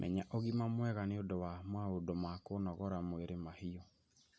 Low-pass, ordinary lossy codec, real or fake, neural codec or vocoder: none; none; real; none